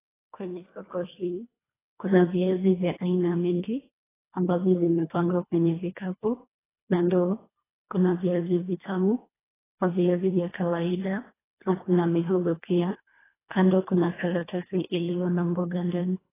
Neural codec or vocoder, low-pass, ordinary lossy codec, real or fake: codec, 24 kHz, 1.5 kbps, HILCodec; 3.6 kHz; AAC, 16 kbps; fake